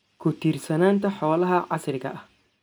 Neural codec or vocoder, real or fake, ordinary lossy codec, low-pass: none; real; none; none